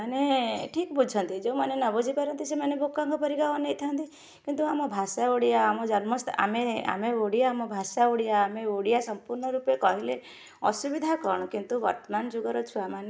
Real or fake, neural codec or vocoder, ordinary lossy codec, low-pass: real; none; none; none